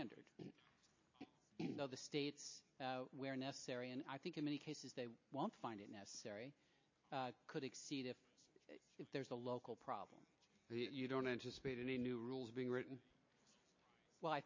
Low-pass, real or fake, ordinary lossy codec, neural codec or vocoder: 7.2 kHz; real; MP3, 32 kbps; none